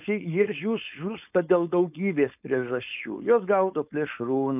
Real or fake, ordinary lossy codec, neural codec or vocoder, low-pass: fake; AAC, 32 kbps; codec, 16 kHz, 4.8 kbps, FACodec; 3.6 kHz